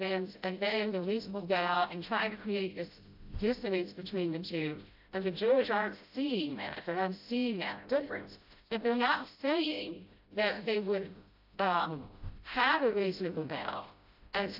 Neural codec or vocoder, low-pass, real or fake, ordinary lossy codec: codec, 16 kHz, 0.5 kbps, FreqCodec, smaller model; 5.4 kHz; fake; AAC, 48 kbps